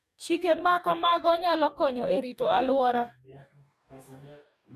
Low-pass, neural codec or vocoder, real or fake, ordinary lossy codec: 14.4 kHz; codec, 44.1 kHz, 2.6 kbps, DAC; fake; none